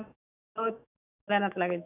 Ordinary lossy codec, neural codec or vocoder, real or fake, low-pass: none; autoencoder, 48 kHz, 128 numbers a frame, DAC-VAE, trained on Japanese speech; fake; 3.6 kHz